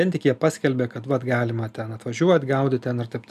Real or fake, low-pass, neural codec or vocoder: real; 14.4 kHz; none